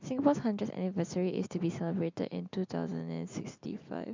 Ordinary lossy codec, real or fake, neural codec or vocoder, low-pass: MP3, 64 kbps; real; none; 7.2 kHz